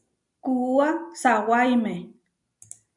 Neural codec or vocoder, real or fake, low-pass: none; real; 10.8 kHz